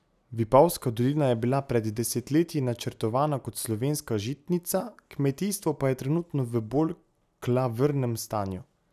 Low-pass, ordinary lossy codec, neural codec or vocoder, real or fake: 14.4 kHz; none; none; real